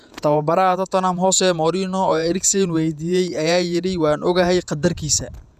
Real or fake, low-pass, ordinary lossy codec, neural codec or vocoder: fake; 14.4 kHz; none; vocoder, 48 kHz, 128 mel bands, Vocos